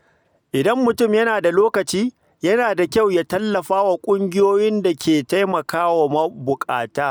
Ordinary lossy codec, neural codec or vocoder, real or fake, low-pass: none; none; real; none